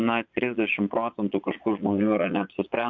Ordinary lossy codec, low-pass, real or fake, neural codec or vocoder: MP3, 64 kbps; 7.2 kHz; fake; vocoder, 22.05 kHz, 80 mel bands, Vocos